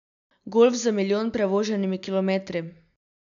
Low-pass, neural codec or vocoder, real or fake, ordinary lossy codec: 7.2 kHz; none; real; none